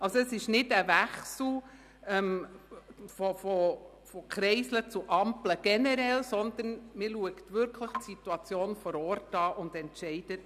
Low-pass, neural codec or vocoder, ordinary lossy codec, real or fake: 14.4 kHz; none; none; real